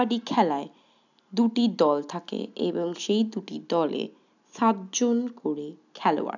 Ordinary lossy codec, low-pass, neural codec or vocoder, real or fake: none; 7.2 kHz; none; real